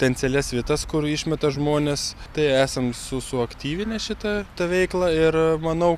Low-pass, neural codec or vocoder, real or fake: 14.4 kHz; none; real